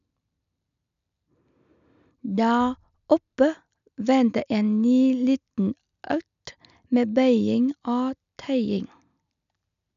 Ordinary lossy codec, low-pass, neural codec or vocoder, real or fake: none; 7.2 kHz; none; real